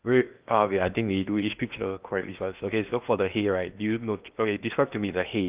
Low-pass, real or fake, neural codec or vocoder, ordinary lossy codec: 3.6 kHz; fake; codec, 16 kHz in and 24 kHz out, 0.8 kbps, FocalCodec, streaming, 65536 codes; Opus, 24 kbps